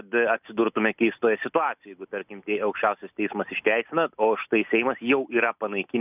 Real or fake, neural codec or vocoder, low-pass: real; none; 3.6 kHz